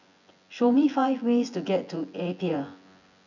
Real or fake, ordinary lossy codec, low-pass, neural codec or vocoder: fake; none; 7.2 kHz; vocoder, 24 kHz, 100 mel bands, Vocos